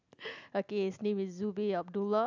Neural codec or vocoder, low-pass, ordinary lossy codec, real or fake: none; 7.2 kHz; none; real